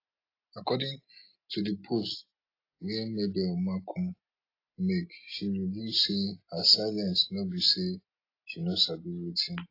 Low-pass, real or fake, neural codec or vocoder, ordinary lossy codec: 5.4 kHz; real; none; AAC, 32 kbps